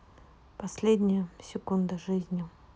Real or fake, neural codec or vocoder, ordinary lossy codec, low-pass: real; none; none; none